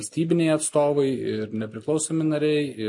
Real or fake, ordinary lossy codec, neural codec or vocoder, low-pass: real; MP3, 48 kbps; none; 10.8 kHz